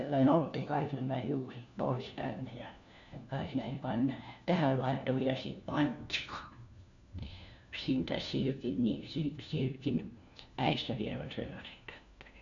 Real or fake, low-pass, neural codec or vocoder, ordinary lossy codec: fake; 7.2 kHz; codec, 16 kHz, 1 kbps, FunCodec, trained on LibriTTS, 50 frames a second; none